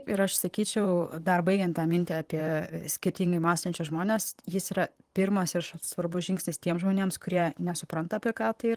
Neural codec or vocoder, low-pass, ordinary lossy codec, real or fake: vocoder, 44.1 kHz, 128 mel bands, Pupu-Vocoder; 14.4 kHz; Opus, 24 kbps; fake